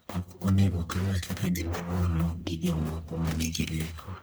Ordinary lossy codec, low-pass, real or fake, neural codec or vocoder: none; none; fake; codec, 44.1 kHz, 1.7 kbps, Pupu-Codec